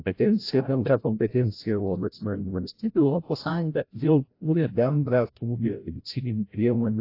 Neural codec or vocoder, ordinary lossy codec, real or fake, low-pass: codec, 16 kHz, 0.5 kbps, FreqCodec, larger model; AAC, 32 kbps; fake; 5.4 kHz